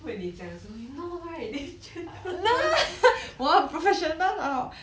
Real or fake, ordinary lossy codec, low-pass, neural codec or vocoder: real; none; none; none